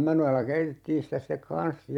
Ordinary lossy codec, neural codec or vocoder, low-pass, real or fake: none; vocoder, 44.1 kHz, 128 mel bands every 256 samples, BigVGAN v2; 19.8 kHz; fake